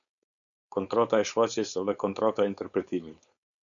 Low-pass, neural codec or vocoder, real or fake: 7.2 kHz; codec, 16 kHz, 4.8 kbps, FACodec; fake